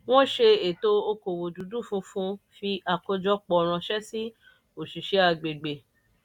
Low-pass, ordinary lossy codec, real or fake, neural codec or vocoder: 19.8 kHz; none; real; none